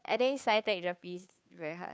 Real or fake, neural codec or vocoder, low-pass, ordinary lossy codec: fake; codec, 16 kHz, 2 kbps, FunCodec, trained on Chinese and English, 25 frames a second; none; none